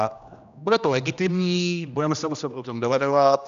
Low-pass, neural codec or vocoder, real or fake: 7.2 kHz; codec, 16 kHz, 1 kbps, X-Codec, HuBERT features, trained on general audio; fake